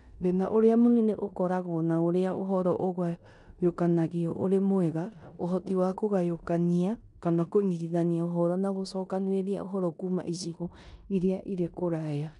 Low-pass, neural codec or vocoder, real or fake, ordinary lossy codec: 10.8 kHz; codec, 16 kHz in and 24 kHz out, 0.9 kbps, LongCat-Audio-Codec, four codebook decoder; fake; none